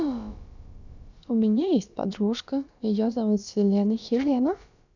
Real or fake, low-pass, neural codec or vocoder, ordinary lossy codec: fake; 7.2 kHz; codec, 16 kHz, about 1 kbps, DyCAST, with the encoder's durations; none